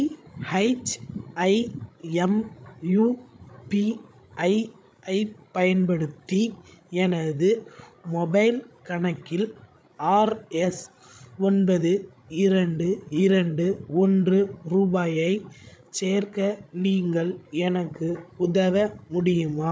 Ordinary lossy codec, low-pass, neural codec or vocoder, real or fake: none; none; codec, 16 kHz, 16 kbps, FreqCodec, larger model; fake